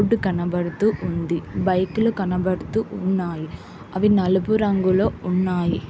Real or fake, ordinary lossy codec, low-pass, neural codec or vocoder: real; none; none; none